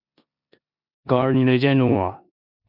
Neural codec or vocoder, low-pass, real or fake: codec, 16 kHz in and 24 kHz out, 0.9 kbps, LongCat-Audio-Codec, four codebook decoder; 5.4 kHz; fake